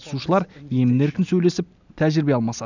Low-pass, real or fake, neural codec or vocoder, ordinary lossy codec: 7.2 kHz; real; none; none